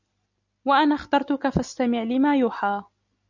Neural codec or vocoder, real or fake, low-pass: none; real; 7.2 kHz